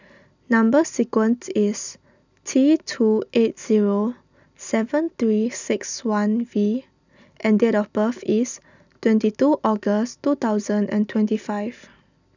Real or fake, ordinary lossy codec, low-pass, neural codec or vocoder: real; none; 7.2 kHz; none